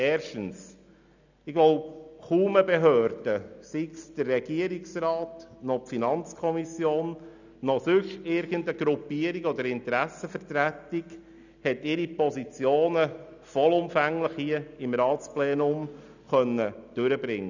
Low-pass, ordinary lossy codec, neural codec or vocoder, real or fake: 7.2 kHz; none; none; real